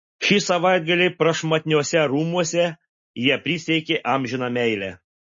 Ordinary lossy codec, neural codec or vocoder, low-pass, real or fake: MP3, 32 kbps; none; 7.2 kHz; real